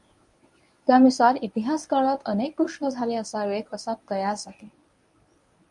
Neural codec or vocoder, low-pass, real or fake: codec, 24 kHz, 0.9 kbps, WavTokenizer, medium speech release version 1; 10.8 kHz; fake